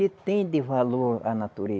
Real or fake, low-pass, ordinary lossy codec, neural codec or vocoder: real; none; none; none